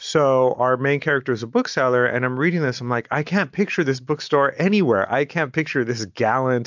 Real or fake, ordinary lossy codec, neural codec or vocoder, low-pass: real; MP3, 64 kbps; none; 7.2 kHz